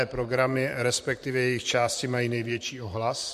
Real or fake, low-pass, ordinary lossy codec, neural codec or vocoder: fake; 14.4 kHz; MP3, 64 kbps; vocoder, 44.1 kHz, 128 mel bands, Pupu-Vocoder